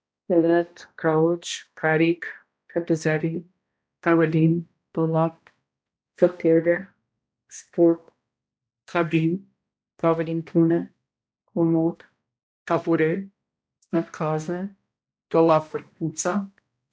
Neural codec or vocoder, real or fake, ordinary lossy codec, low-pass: codec, 16 kHz, 1 kbps, X-Codec, HuBERT features, trained on balanced general audio; fake; none; none